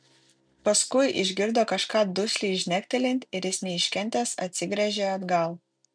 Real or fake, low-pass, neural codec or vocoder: real; 9.9 kHz; none